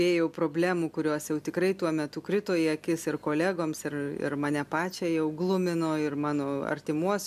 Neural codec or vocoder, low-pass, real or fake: none; 14.4 kHz; real